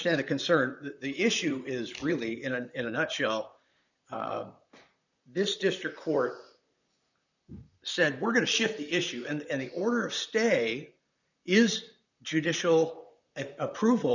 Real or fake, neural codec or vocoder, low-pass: fake; vocoder, 44.1 kHz, 128 mel bands, Pupu-Vocoder; 7.2 kHz